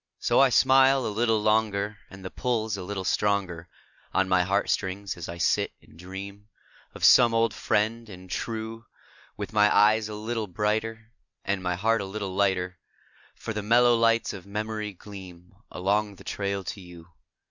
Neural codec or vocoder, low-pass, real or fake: none; 7.2 kHz; real